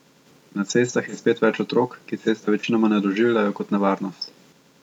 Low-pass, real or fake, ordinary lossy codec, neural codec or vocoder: 19.8 kHz; real; none; none